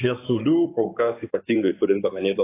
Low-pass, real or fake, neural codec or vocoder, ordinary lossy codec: 3.6 kHz; fake; codec, 16 kHz in and 24 kHz out, 2.2 kbps, FireRedTTS-2 codec; AAC, 24 kbps